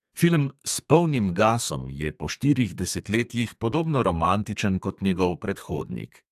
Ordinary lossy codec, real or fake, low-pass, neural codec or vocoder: AAC, 96 kbps; fake; 14.4 kHz; codec, 32 kHz, 1.9 kbps, SNAC